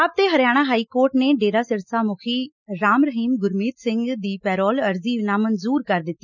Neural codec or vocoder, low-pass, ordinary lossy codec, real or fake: none; 7.2 kHz; none; real